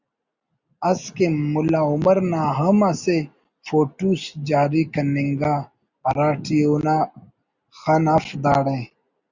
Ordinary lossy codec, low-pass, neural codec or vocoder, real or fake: Opus, 64 kbps; 7.2 kHz; none; real